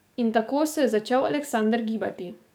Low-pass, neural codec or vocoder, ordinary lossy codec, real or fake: none; codec, 44.1 kHz, 7.8 kbps, DAC; none; fake